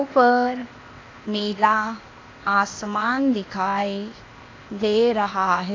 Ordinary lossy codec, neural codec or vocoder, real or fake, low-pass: AAC, 32 kbps; codec, 16 kHz, 0.8 kbps, ZipCodec; fake; 7.2 kHz